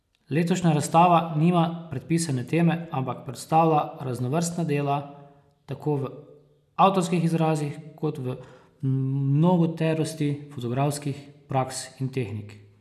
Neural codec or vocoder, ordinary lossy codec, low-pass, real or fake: none; none; 14.4 kHz; real